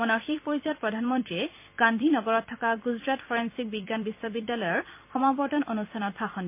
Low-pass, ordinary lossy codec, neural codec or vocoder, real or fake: 3.6 kHz; MP3, 24 kbps; vocoder, 44.1 kHz, 128 mel bands every 256 samples, BigVGAN v2; fake